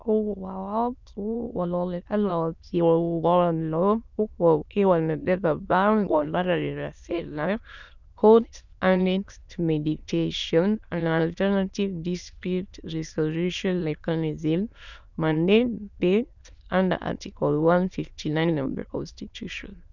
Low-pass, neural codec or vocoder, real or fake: 7.2 kHz; autoencoder, 22.05 kHz, a latent of 192 numbers a frame, VITS, trained on many speakers; fake